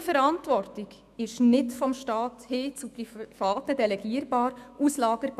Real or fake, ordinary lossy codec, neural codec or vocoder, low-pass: fake; none; autoencoder, 48 kHz, 128 numbers a frame, DAC-VAE, trained on Japanese speech; 14.4 kHz